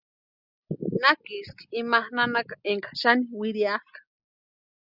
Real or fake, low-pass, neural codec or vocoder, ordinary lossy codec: real; 5.4 kHz; none; Opus, 64 kbps